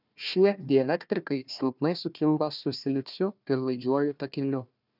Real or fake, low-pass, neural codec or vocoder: fake; 5.4 kHz; codec, 16 kHz, 1 kbps, FunCodec, trained on Chinese and English, 50 frames a second